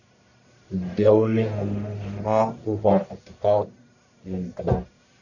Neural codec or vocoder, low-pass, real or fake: codec, 44.1 kHz, 1.7 kbps, Pupu-Codec; 7.2 kHz; fake